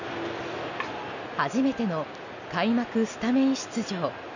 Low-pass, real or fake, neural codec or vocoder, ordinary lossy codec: 7.2 kHz; real; none; none